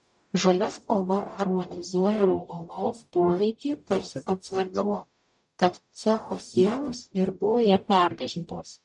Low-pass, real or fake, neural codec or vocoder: 10.8 kHz; fake; codec, 44.1 kHz, 0.9 kbps, DAC